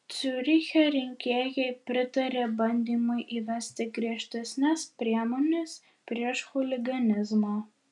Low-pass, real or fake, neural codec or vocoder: 10.8 kHz; real; none